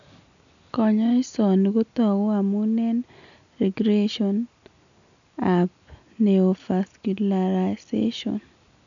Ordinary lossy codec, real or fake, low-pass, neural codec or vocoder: none; real; 7.2 kHz; none